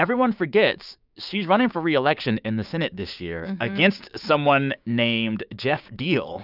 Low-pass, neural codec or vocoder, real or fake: 5.4 kHz; codec, 16 kHz, 6 kbps, DAC; fake